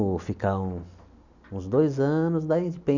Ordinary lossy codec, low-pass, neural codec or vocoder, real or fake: none; 7.2 kHz; none; real